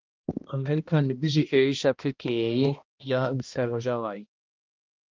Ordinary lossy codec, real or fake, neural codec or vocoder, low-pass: Opus, 24 kbps; fake; codec, 16 kHz, 1 kbps, X-Codec, HuBERT features, trained on balanced general audio; 7.2 kHz